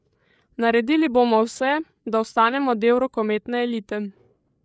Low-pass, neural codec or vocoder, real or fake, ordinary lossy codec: none; codec, 16 kHz, 8 kbps, FreqCodec, larger model; fake; none